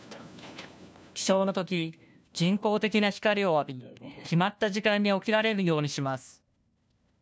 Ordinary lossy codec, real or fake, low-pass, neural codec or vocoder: none; fake; none; codec, 16 kHz, 1 kbps, FunCodec, trained on LibriTTS, 50 frames a second